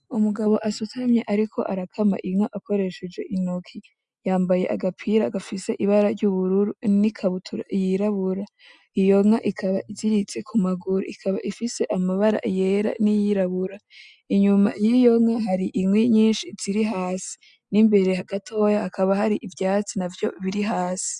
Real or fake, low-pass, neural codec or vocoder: real; 10.8 kHz; none